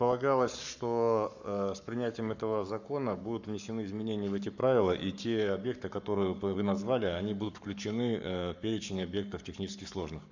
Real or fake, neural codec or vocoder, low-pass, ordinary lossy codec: fake; codec, 44.1 kHz, 7.8 kbps, Pupu-Codec; 7.2 kHz; none